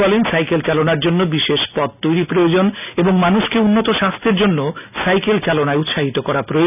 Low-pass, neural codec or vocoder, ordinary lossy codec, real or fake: 3.6 kHz; none; none; real